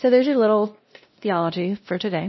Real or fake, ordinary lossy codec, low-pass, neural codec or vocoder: fake; MP3, 24 kbps; 7.2 kHz; codec, 16 kHz, 1 kbps, X-Codec, WavLM features, trained on Multilingual LibriSpeech